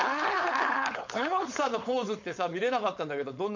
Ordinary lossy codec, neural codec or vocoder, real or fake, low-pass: MP3, 64 kbps; codec, 16 kHz, 4.8 kbps, FACodec; fake; 7.2 kHz